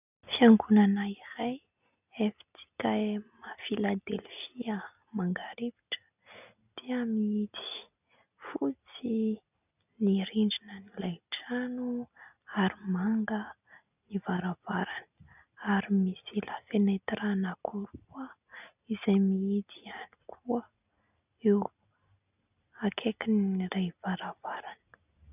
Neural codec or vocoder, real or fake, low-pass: none; real; 3.6 kHz